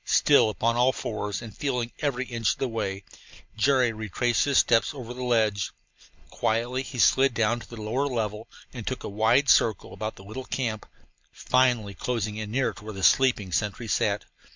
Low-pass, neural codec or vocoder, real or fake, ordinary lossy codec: 7.2 kHz; none; real; MP3, 64 kbps